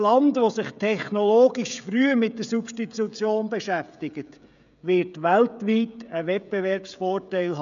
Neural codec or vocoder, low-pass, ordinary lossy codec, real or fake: codec, 16 kHz, 16 kbps, FreqCodec, smaller model; 7.2 kHz; none; fake